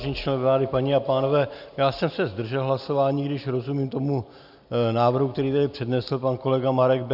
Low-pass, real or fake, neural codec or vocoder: 5.4 kHz; real; none